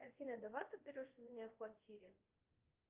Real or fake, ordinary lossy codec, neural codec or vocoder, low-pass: fake; Opus, 24 kbps; codec, 24 kHz, 0.5 kbps, DualCodec; 3.6 kHz